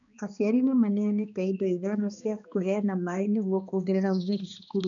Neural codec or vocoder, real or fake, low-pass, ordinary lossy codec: codec, 16 kHz, 2 kbps, X-Codec, HuBERT features, trained on balanced general audio; fake; 7.2 kHz; none